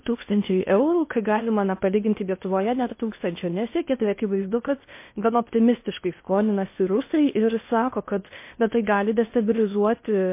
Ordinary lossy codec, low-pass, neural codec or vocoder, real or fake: MP3, 24 kbps; 3.6 kHz; codec, 16 kHz in and 24 kHz out, 0.6 kbps, FocalCodec, streaming, 2048 codes; fake